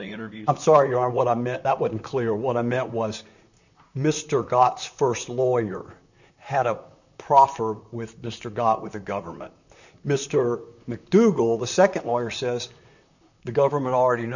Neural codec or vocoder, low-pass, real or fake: vocoder, 44.1 kHz, 128 mel bands, Pupu-Vocoder; 7.2 kHz; fake